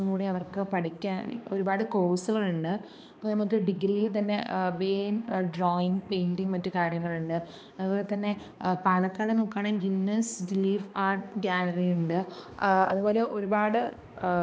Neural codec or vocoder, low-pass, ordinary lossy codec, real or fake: codec, 16 kHz, 2 kbps, X-Codec, HuBERT features, trained on balanced general audio; none; none; fake